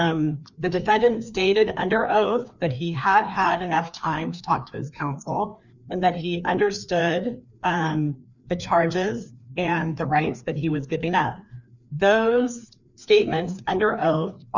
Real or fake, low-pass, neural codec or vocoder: fake; 7.2 kHz; codec, 16 kHz, 2 kbps, FreqCodec, larger model